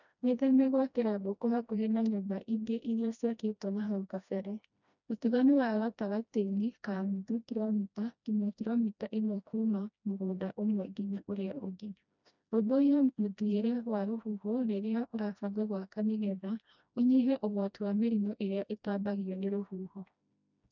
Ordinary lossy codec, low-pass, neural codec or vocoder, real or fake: none; 7.2 kHz; codec, 16 kHz, 1 kbps, FreqCodec, smaller model; fake